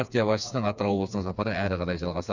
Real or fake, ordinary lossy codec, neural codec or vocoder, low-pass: fake; none; codec, 16 kHz, 4 kbps, FreqCodec, smaller model; 7.2 kHz